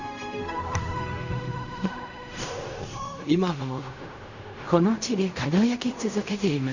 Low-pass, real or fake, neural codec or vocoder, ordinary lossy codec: 7.2 kHz; fake; codec, 16 kHz in and 24 kHz out, 0.4 kbps, LongCat-Audio-Codec, fine tuned four codebook decoder; none